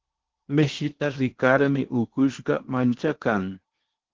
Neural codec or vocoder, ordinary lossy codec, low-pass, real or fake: codec, 16 kHz in and 24 kHz out, 0.8 kbps, FocalCodec, streaming, 65536 codes; Opus, 16 kbps; 7.2 kHz; fake